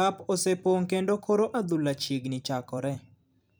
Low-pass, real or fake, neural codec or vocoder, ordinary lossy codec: none; fake; vocoder, 44.1 kHz, 128 mel bands every 256 samples, BigVGAN v2; none